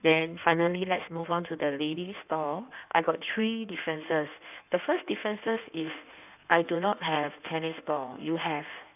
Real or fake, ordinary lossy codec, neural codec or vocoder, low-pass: fake; none; codec, 16 kHz in and 24 kHz out, 1.1 kbps, FireRedTTS-2 codec; 3.6 kHz